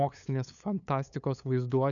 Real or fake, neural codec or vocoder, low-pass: fake; codec, 16 kHz, 8 kbps, FunCodec, trained on LibriTTS, 25 frames a second; 7.2 kHz